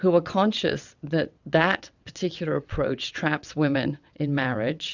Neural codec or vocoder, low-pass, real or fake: vocoder, 22.05 kHz, 80 mel bands, WaveNeXt; 7.2 kHz; fake